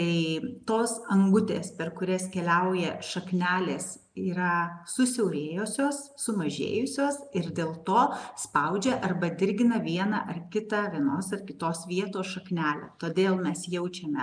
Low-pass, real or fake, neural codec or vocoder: 9.9 kHz; real; none